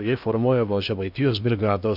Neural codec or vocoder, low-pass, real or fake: codec, 16 kHz in and 24 kHz out, 0.8 kbps, FocalCodec, streaming, 65536 codes; 5.4 kHz; fake